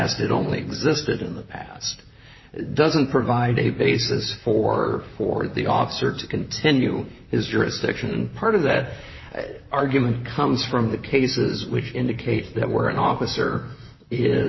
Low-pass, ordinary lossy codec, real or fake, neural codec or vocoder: 7.2 kHz; MP3, 24 kbps; fake; vocoder, 44.1 kHz, 128 mel bands, Pupu-Vocoder